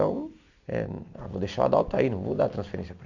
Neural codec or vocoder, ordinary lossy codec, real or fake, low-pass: none; none; real; 7.2 kHz